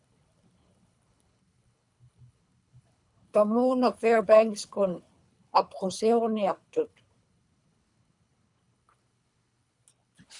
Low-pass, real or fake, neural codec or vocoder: 10.8 kHz; fake; codec, 24 kHz, 3 kbps, HILCodec